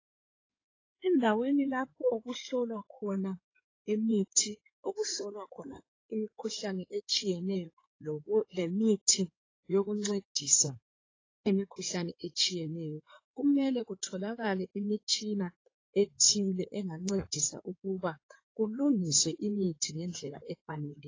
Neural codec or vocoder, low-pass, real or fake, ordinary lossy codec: codec, 16 kHz in and 24 kHz out, 2.2 kbps, FireRedTTS-2 codec; 7.2 kHz; fake; AAC, 32 kbps